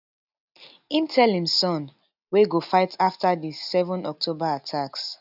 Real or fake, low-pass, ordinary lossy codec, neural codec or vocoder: real; 5.4 kHz; none; none